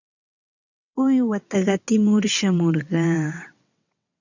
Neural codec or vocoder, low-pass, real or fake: vocoder, 22.05 kHz, 80 mel bands, WaveNeXt; 7.2 kHz; fake